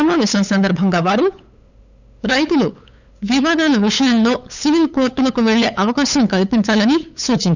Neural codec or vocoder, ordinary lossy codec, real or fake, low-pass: codec, 16 kHz, 8 kbps, FunCodec, trained on LibriTTS, 25 frames a second; none; fake; 7.2 kHz